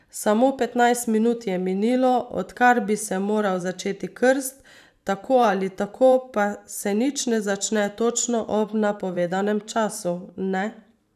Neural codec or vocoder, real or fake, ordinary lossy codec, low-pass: vocoder, 44.1 kHz, 128 mel bands every 512 samples, BigVGAN v2; fake; none; 14.4 kHz